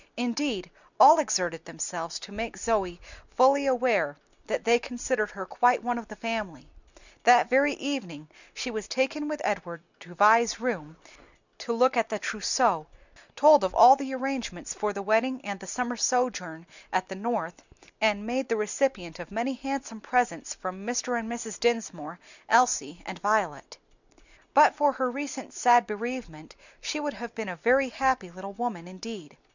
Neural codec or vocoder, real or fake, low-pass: none; real; 7.2 kHz